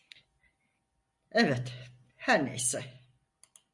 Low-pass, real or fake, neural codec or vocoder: 10.8 kHz; real; none